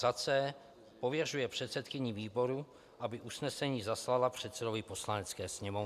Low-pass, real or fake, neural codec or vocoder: 14.4 kHz; real; none